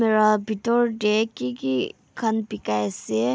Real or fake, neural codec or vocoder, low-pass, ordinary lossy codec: real; none; none; none